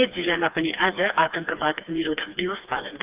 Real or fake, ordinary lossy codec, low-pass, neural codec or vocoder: fake; Opus, 24 kbps; 3.6 kHz; codec, 16 kHz, 2 kbps, FreqCodec, smaller model